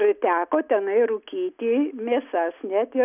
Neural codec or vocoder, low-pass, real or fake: none; 3.6 kHz; real